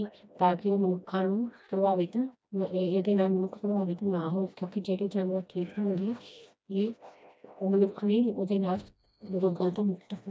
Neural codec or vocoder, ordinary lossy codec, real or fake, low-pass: codec, 16 kHz, 1 kbps, FreqCodec, smaller model; none; fake; none